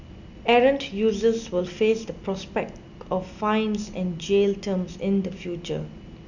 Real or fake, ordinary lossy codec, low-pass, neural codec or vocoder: real; none; 7.2 kHz; none